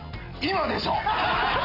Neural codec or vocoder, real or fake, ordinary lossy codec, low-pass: none; real; none; 5.4 kHz